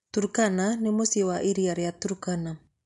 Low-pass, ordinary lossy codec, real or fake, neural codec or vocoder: 10.8 kHz; MP3, 64 kbps; real; none